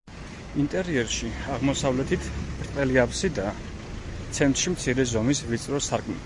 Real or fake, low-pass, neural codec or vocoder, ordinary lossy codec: real; 10.8 kHz; none; Opus, 64 kbps